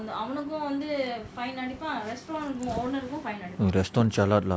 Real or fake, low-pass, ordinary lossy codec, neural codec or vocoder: real; none; none; none